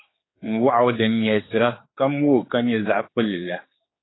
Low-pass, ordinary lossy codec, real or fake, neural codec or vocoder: 7.2 kHz; AAC, 16 kbps; fake; codec, 16 kHz, 4 kbps, X-Codec, HuBERT features, trained on general audio